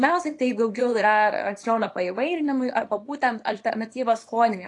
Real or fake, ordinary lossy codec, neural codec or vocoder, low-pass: fake; AAC, 48 kbps; codec, 24 kHz, 0.9 kbps, WavTokenizer, small release; 10.8 kHz